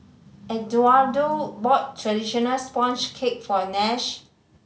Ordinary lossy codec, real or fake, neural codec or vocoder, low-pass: none; real; none; none